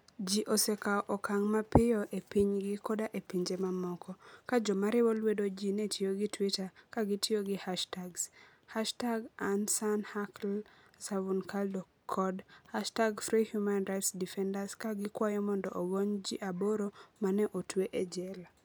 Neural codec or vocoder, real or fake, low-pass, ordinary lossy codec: none; real; none; none